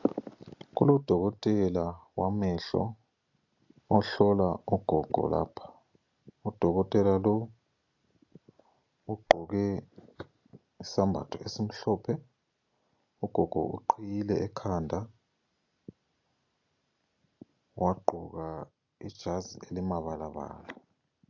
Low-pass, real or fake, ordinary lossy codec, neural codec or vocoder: 7.2 kHz; real; AAC, 48 kbps; none